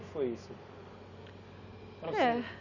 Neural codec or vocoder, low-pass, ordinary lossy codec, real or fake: none; 7.2 kHz; none; real